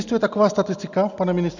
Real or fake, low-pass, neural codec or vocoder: real; 7.2 kHz; none